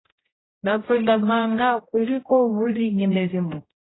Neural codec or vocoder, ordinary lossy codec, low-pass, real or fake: codec, 16 kHz, 0.5 kbps, X-Codec, HuBERT features, trained on general audio; AAC, 16 kbps; 7.2 kHz; fake